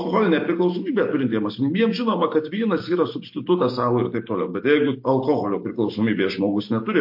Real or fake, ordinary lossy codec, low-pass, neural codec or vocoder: real; MP3, 32 kbps; 5.4 kHz; none